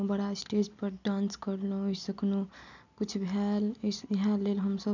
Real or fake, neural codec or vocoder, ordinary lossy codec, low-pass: real; none; none; 7.2 kHz